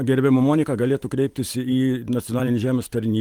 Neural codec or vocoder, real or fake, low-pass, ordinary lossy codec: vocoder, 44.1 kHz, 128 mel bands every 512 samples, BigVGAN v2; fake; 19.8 kHz; Opus, 24 kbps